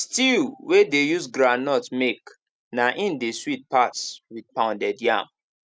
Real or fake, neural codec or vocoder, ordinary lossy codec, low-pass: real; none; none; none